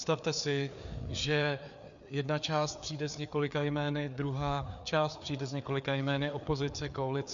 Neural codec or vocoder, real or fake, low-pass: codec, 16 kHz, 4 kbps, FreqCodec, larger model; fake; 7.2 kHz